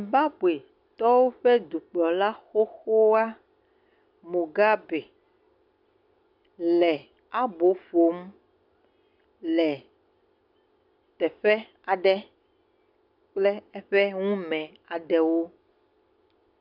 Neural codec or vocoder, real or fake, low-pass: none; real; 5.4 kHz